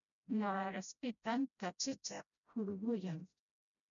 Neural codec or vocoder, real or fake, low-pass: codec, 16 kHz, 0.5 kbps, FreqCodec, smaller model; fake; 7.2 kHz